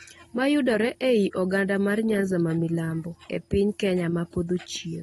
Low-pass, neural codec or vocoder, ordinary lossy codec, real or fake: 19.8 kHz; none; AAC, 32 kbps; real